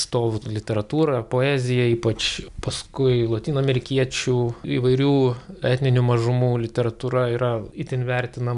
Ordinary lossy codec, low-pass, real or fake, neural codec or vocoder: MP3, 96 kbps; 10.8 kHz; real; none